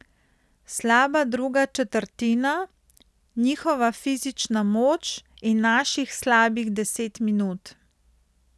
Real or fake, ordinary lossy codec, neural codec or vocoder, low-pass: real; none; none; none